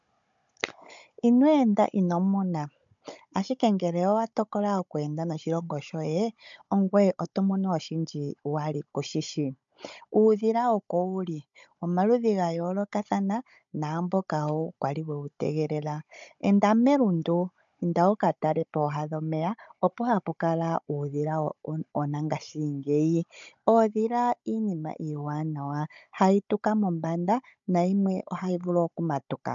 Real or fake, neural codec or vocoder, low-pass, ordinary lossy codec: fake; codec, 16 kHz, 16 kbps, FunCodec, trained on Chinese and English, 50 frames a second; 7.2 kHz; MP3, 64 kbps